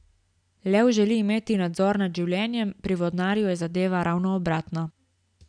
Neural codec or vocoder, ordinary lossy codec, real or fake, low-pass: none; none; real; 9.9 kHz